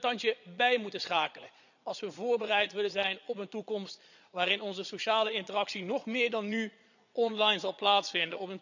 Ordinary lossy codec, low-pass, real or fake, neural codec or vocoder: none; 7.2 kHz; fake; vocoder, 22.05 kHz, 80 mel bands, Vocos